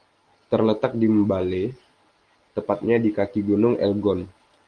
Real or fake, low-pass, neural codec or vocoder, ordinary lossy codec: real; 9.9 kHz; none; Opus, 24 kbps